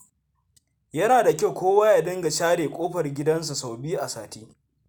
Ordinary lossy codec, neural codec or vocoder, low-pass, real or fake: none; none; none; real